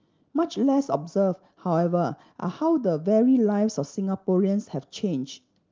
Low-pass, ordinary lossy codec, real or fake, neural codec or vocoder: 7.2 kHz; Opus, 32 kbps; real; none